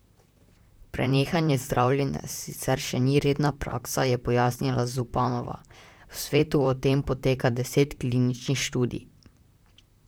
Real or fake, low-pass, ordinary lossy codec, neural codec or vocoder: fake; none; none; vocoder, 44.1 kHz, 128 mel bands, Pupu-Vocoder